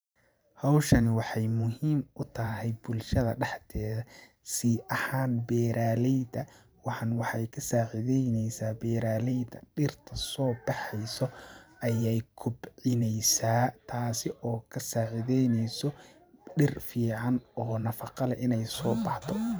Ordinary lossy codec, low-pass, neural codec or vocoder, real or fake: none; none; vocoder, 44.1 kHz, 128 mel bands every 512 samples, BigVGAN v2; fake